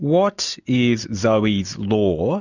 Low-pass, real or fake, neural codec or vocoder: 7.2 kHz; real; none